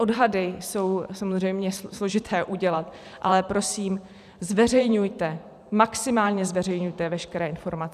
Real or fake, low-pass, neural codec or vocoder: fake; 14.4 kHz; vocoder, 44.1 kHz, 128 mel bands every 512 samples, BigVGAN v2